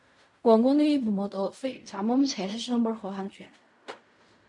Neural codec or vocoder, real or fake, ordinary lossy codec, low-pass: codec, 16 kHz in and 24 kHz out, 0.4 kbps, LongCat-Audio-Codec, fine tuned four codebook decoder; fake; MP3, 64 kbps; 10.8 kHz